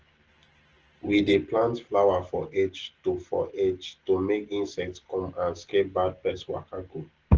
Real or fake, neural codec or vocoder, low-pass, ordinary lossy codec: real; none; 7.2 kHz; Opus, 16 kbps